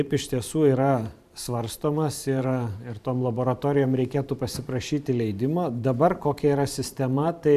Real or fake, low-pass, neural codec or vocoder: fake; 14.4 kHz; vocoder, 44.1 kHz, 128 mel bands every 512 samples, BigVGAN v2